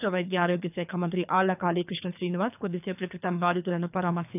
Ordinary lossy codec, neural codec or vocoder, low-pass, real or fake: none; codec, 24 kHz, 3 kbps, HILCodec; 3.6 kHz; fake